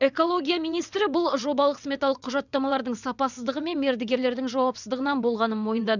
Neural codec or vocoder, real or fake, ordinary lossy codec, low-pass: vocoder, 22.05 kHz, 80 mel bands, WaveNeXt; fake; none; 7.2 kHz